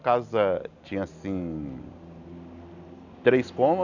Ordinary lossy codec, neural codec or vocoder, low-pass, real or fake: none; none; 7.2 kHz; real